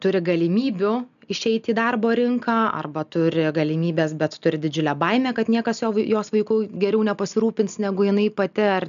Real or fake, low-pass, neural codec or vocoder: real; 7.2 kHz; none